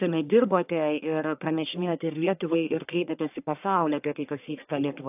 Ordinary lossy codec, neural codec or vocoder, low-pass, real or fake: AAC, 32 kbps; codec, 44.1 kHz, 1.7 kbps, Pupu-Codec; 3.6 kHz; fake